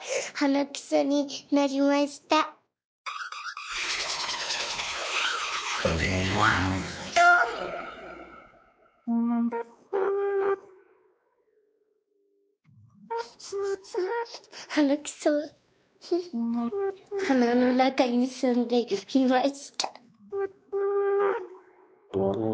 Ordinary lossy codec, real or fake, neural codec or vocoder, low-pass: none; fake; codec, 16 kHz, 2 kbps, X-Codec, WavLM features, trained on Multilingual LibriSpeech; none